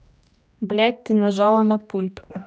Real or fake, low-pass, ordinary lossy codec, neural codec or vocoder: fake; none; none; codec, 16 kHz, 1 kbps, X-Codec, HuBERT features, trained on general audio